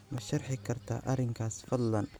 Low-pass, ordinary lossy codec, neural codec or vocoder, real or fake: none; none; none; real